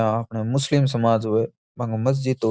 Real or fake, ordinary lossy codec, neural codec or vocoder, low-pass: real; none; none; none